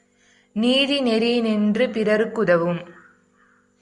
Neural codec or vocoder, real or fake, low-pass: none; real; 10.8 kHz